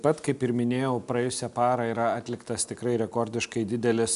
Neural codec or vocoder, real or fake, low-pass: none; real; 10.8 kHz